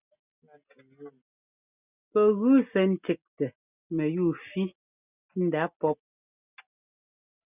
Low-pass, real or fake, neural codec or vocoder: 3.6 kHz; real; none